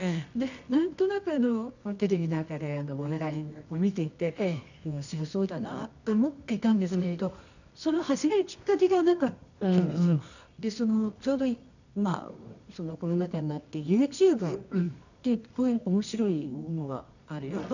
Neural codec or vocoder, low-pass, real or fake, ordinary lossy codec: codec, 24 kHz, 0.9 kbps, WavTokenizer, medium music audio release; 7.2 kHz; fake; none